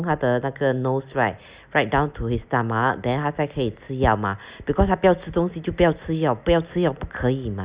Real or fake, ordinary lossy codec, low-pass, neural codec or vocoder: real; Opus, 64 kbps; 3.6 kHz; none